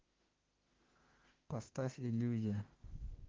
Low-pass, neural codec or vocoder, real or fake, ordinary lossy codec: 7.2 kHz; autoencoder, 48 kHz, 32 numbers a frame, DAC-VAE, trained on Japanese speech; fake; Opus, 16 kbps